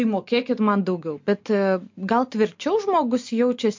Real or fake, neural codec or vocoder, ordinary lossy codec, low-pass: real; none; MP3, 48 kbps; 7.2 kHz